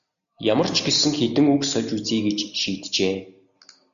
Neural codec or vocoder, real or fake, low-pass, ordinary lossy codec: none; real; 7.2 kHz; AAC, 96 kbps